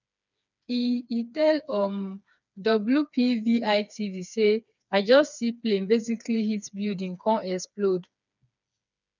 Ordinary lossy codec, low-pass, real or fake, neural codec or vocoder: none; 7.2 kHz; fake; codec, 16 kHz, 4 kbps, FreqCodec, smaller model